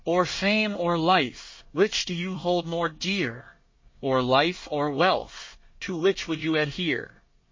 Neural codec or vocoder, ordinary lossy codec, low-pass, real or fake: codec, 24 kHz, 1 kbps, SNAC; MP3, 32 kbps; 7.2 kHz; fake